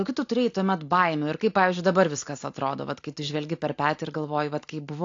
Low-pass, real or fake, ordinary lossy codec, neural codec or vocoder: 7.2 kHz; real; AAC, 48 kbps; none